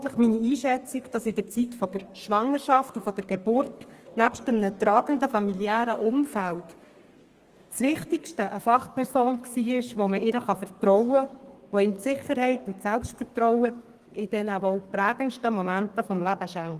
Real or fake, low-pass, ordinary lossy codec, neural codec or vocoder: fake; 14.4 kHz; Opus, 24 kbps; codec, 32 kHz, 1.9 kbps, SNAC